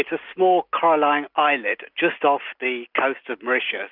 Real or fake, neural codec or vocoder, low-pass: real; none; 5.4 kHz